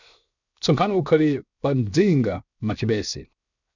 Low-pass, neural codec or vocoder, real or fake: 7.2 kHz; codec, 16 kHz, 0.7 kbps, FocalCodec; fake